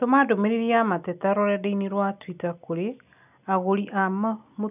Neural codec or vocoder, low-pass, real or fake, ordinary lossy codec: none; 3.6 kHz; real; none